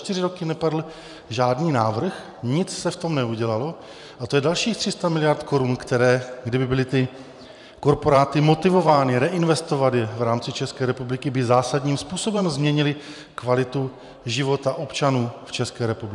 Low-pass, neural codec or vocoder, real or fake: 10.8 kHz; vocoder, 44.1 kHz, 128 mel bands every 512 samples, BigVGAN v2; fake